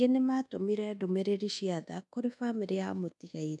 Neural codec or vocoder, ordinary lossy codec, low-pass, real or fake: codec, 24 kHz, 1.2 kbps, DualCodec; none; 10.8 kHz; fake